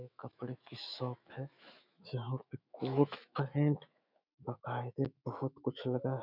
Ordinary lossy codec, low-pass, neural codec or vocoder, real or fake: none; 5.4 kHz; autoencoder, 48 kHz, 128 numbers a frame, DAC-VAE, trained on Japanese speech; fake